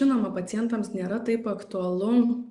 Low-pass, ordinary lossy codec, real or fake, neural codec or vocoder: 10.8 kHz; Opus, 64 kbps; real; none